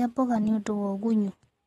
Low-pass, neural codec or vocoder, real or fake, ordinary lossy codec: 19.8 kHz; none; real; AAC, 32 kbps